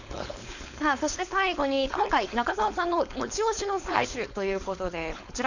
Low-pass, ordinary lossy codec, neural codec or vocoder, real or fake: 7.2 kHz; none; codec, 16 kHz, 4.8 kbps, FACodec; fake